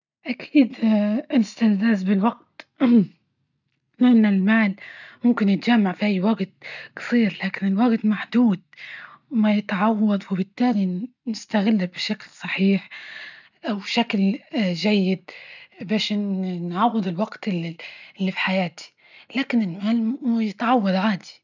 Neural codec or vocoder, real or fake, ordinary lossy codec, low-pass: none; real; none; 7.2 kHz